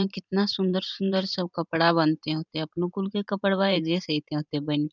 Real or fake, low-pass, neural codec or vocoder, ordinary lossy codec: fake; 7.2 kHz; vocoder, 44.1 kHz, 128 mel bands every 512 samples, BigVGAN v2; none